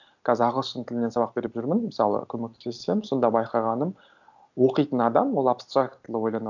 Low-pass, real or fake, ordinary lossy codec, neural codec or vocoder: 7.2 kHz; real; none; none